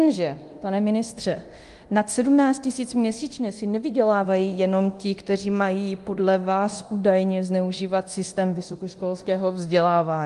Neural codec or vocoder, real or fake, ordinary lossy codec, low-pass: codec, 24 kHz, 0.9 kbps, DualCodec; fake; Opus, 24 kbps; 10.8 kHz